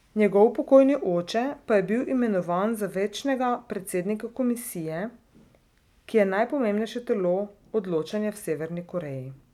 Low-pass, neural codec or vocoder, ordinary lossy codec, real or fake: 19.8 kHz; none; none; real